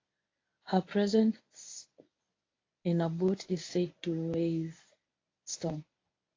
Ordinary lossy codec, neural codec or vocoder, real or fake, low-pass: AAC, 32 kbps; codec, 24 kHz, 0.9 kbps, WavTokenizer, medium speech release version 1; fake; 7.2 kHz